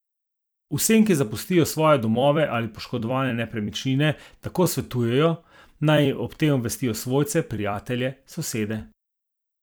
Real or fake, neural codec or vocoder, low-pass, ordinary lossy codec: fake; vocoder, 44.1 kHz, 128 mel bands every 256 samples, BigVGAN v2; none; none